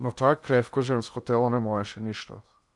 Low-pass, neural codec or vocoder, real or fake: 10.8 kHz; codec, 16 kHz in and 24 kHz out, 0.8 kbps, FocalCodec, streaming, 65536 codes; fake